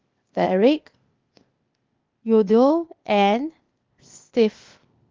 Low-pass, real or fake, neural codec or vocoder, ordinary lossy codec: 7.2 kHz; fake; codec, 16 kHz, 0.8 kbps, ZipCodec; Opus, 24 kbps